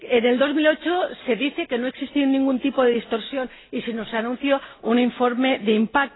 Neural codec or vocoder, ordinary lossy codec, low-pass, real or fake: none; AAC, 16 kbps; 7.2 kHz; real